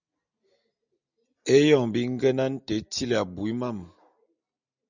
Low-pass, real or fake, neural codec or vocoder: 7.2 kHz; real; none